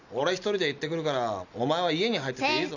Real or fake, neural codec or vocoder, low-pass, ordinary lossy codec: real; none; 7.2 kHz; none